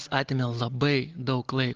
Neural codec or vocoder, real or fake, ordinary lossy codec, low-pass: none; real; Opus, 16 kbps; 7.2 kHz